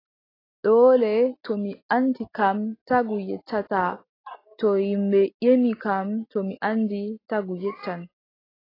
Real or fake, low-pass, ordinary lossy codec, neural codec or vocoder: real; 5.4 kHz; AAC, 24 kbps; none